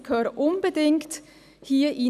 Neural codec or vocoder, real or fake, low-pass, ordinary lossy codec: none; real; 14.4 kHz; none